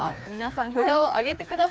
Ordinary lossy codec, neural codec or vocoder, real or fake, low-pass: none; codec, 16 kHz, 2 kbps, FreqCodec, larger model; fake; none